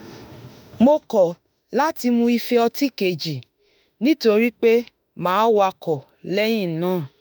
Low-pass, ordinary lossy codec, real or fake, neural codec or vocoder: none; none; fake; autoencoder, 48 kHz, 32 numbers a frame, DAC-VAE, trained on Japanese speech